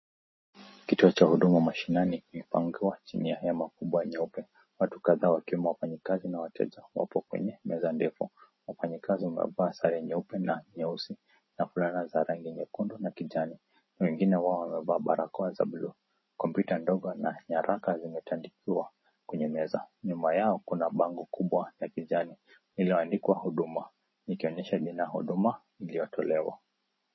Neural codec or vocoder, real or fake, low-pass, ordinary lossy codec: none; real; 7.2 kHz; MP3, 24 kbps